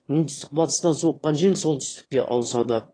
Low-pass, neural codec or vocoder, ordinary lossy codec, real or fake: 9.9 kHz; autoencoder, 22.05 kHz, a latent of 192 numbers a frame, VITS, trained on one speaker; AAC, 32 kbps; fake